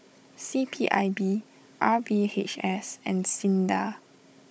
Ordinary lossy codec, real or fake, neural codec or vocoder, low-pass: none; fake; codec, 16 kHz, 16 kbps, FunCodec, trained on Chinese and English, 50 frames a second; none